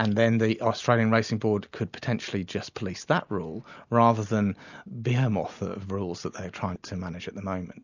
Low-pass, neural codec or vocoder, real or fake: 7.2 kHz; none; real